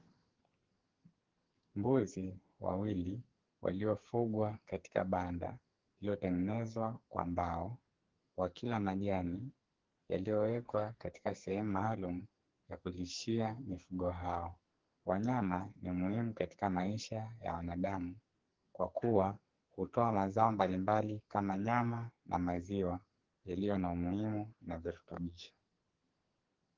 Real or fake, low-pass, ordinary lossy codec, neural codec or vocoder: fake; 7.2 kHz; Opus, 16 kbps; codec, 44.1 kHz, 2.6 kbps, SNAC